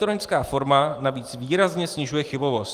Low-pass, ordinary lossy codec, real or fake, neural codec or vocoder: 14.4 kHz; Opus, 32 kbps; fake; vocoder, 44.1 kHz, 128 mel bands every 512 samples, BigVGAN v2